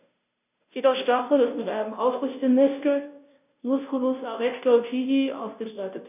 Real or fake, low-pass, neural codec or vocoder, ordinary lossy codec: fake; 3.6 kHz; codec, 16 kHz, 0.5 kbps, FunCodec, trained on Chinese and English, 25 frames a second; none